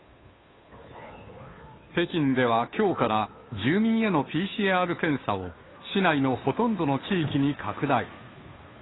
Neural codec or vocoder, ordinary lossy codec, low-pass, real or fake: codec, 16 kHz, 2 kbps, FunCodec, trained on Chinese and English, 25 frames a second; AAC, 16 kbps; 7.2 kHz; fake